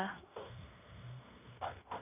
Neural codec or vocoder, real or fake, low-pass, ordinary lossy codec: codec, 16 kHz in and 24 kHz out, 0.9 kbps, LongCat-Audio-Codec, fine tuned four codebook decoder; fake; 3.6 kHz; none